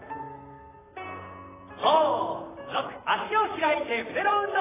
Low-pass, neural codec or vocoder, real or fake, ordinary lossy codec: 3.6 kHz; vocoder, 44.1 kHz, 128 mel bands, Pupu-Vocoder; fake; AAC, 16 kbps